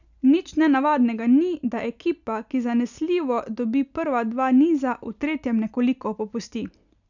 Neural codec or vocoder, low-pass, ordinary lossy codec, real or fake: none; 7.2 kHz; none; real